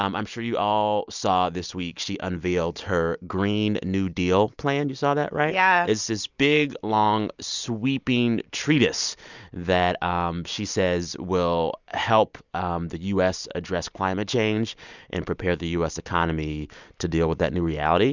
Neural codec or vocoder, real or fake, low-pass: none; real; 7.2 kHz